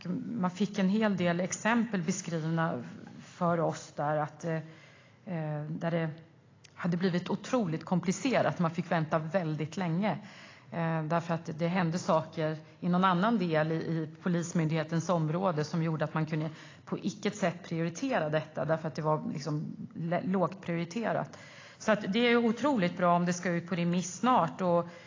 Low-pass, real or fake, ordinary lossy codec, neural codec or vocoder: 7.2 kHz; real; AAC, 32 kbps; none